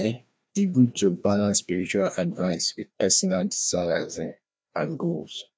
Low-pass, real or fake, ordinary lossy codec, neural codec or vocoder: none; fake; none; codec, 16 kHz, 1 kbps, FreqCodec, larger model